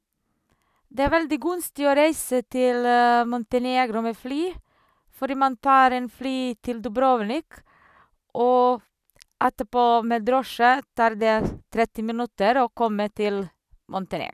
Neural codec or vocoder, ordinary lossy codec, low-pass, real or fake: none; none; 14.4 kHz; real